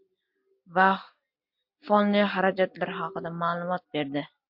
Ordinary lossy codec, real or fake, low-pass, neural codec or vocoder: MP3, 48 kbps; real; 5.4 kHz; none